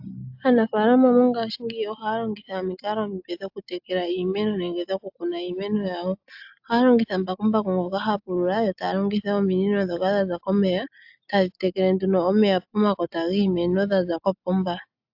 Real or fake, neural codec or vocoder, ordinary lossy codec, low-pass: real; none; AAC, 48 kbps; 5.4 kHz